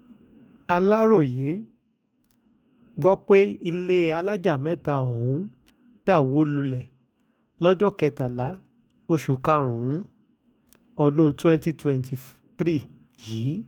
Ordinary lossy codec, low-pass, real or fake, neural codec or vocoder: none; 19.8 kHz; fake; codec, 44.1 kHz, 2.6 kbps, DAC